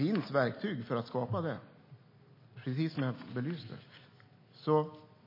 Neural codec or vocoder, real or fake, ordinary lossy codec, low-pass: none; real; MP3, 24 kbps; 5.4 kHz